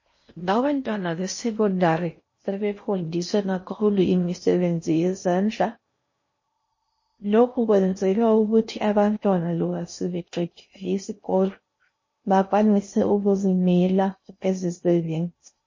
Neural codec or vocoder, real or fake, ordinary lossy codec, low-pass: codec, 16 kHz in and 24 kHz out, 0.6 kbps, FocalCodec, streaming, 4096 codes; fake; MP3, 32 kbps; 7.2 kHz